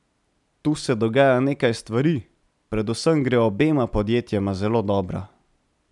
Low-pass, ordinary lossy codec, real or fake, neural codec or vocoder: 10.8 kHz; none; real; none